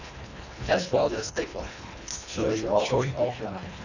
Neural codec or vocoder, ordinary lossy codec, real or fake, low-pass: codec, 24 kHz, 1.5 kbps, HILCodec; none; fake; 7.2 kHz